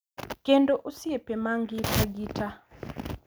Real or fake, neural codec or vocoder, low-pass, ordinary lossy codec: real; none; none; none